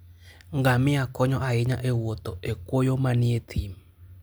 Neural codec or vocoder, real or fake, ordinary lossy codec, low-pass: none; real; none; none